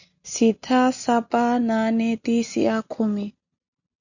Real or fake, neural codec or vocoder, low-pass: real; none; 7.2 kHz